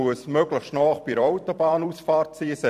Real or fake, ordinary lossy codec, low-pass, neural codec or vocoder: fake; MP3, 96 kbps; 14.4 kHz; vocoder, 44.1 kHz, 128 mel bands every 256 samples, BigVGAN v2